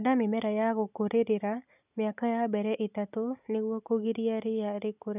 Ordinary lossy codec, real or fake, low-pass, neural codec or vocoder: none; real; 3.6 kHz; none